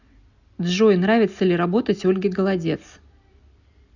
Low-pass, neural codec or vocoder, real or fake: 7.2 kHz; none; real